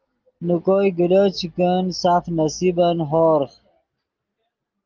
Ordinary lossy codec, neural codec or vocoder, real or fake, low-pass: Opus, 32 kbps; none; real; 7.2 kHz